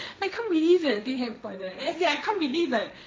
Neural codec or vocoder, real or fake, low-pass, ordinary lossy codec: codec, 16 kHz, 1.1 kbps, Voila-Tokenizer; fake; none; none